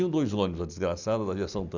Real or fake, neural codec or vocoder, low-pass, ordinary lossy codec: real; none; 7.2 kHz; none